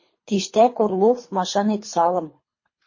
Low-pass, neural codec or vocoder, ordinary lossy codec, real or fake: 7.2 kHz; codec, 24 kHz, 3 kbps, HILCodec; MP3, 32 kbps; fake